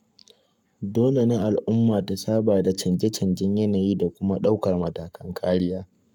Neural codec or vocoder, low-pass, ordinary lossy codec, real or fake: codec, 44.1 kHz, 7.8 kbps, Pupu-Codec; 19.8 kHz; none; fake